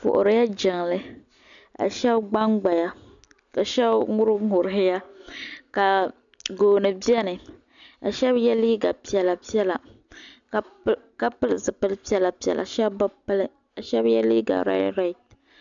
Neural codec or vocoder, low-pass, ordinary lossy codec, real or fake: none; 7.2 kHz; MP3, 96 kbps; real